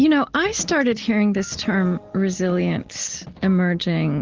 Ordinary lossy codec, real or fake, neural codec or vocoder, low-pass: Opus, 16 kbps; real; none; 7.2 kHz